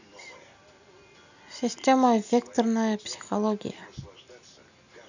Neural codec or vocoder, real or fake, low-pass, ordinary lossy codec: none; real; 7.2 kHz; none